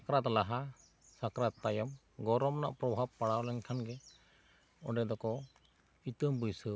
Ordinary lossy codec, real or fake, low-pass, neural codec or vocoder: none; real; none; none